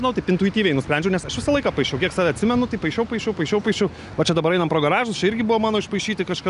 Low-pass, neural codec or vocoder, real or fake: 10.8 kHz; none; real